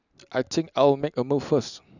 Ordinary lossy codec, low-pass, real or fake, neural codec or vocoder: none; 7.2 kHz; real; none